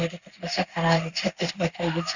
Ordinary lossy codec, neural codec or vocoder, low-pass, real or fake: none; codec, 16 kHz in and 24 kHz out, 1 kbps, XY-Tokenizer; 7.2 kHz; fake